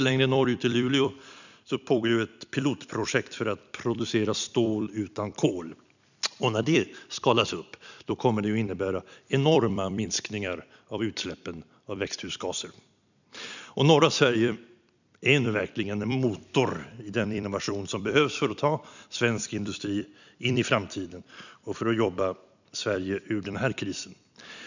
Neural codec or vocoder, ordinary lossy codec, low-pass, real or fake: vocoder, 44.1 kHz, 128 mel bands every 256 samples, BigVGAN v2; none; 7.2 kHz; fake